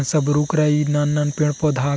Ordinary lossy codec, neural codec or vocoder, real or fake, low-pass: none; none; real; none